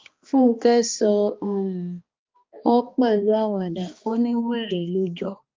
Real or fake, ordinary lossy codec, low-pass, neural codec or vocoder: fake; Opus, 24 kbps; 7.2 kHz; codec, 16 kHz, 2 kbps, X-Codec, HuBERT features, trained on balanced general audio